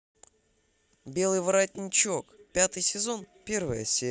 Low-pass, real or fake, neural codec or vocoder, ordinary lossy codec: none; real; none; none